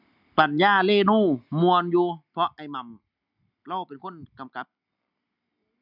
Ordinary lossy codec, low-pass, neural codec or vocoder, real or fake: none; 5.4 kHz; none; real